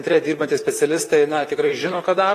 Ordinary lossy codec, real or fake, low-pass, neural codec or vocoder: AAC, 48 kbps; fake; 14.4 kHz; vocoder, 44.1 kHz, 128 mel bands, Pupu-Vocoder